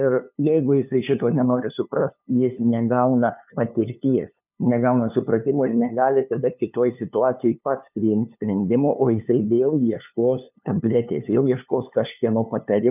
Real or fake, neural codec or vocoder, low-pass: fake; codec, 16 kHz, 2 kbps, FunCodec, trained on LibriTTS, 25 frames a second; 3.6 kHz